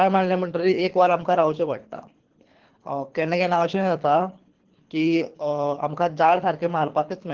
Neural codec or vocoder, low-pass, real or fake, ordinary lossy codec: codec, 24 kHz, 3 kbps, HILCodec; 7.2 kHz; fake; Opus, 16 kbps